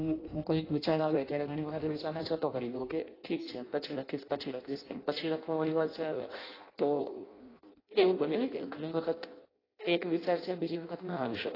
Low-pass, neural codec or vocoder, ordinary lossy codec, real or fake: 5.4 kHz; codec, 16 kHz in and 24 kHz out, 0.6 kbps, FireRedTTS-2 codec; AAC, 24 kbps; fake